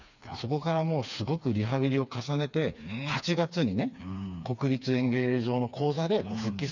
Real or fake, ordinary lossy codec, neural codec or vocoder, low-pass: fake; none; codec, 16 kHz, 4 kbps, FreqCodec, smaller model; 7.2 kHz